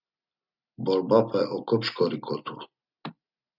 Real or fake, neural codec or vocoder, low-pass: real; none; 5.4 kHz